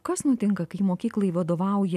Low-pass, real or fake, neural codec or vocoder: 14.4 kHz; real; none